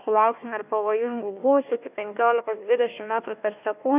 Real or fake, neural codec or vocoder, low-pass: fake; codec, 16 kHz, 1 kbps, FunCodec, trained on Chinese and English, 50 frames a second; 3.6 kHz